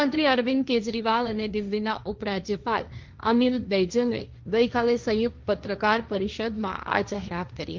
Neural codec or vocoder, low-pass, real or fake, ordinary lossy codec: codec, 16 kHz, 1.1 kbps, Voila-Tokenizer; 7.2 kHz; fake; Opus, 24 kbps